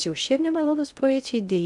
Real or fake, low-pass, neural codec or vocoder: fake; 10.8 kHz; codec, 16 kHz in and 24 kHz out, 0.6 kbps, FocalCodec, streaming, 2048 codes